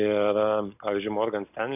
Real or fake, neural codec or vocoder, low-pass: real; none; 3.6 kHz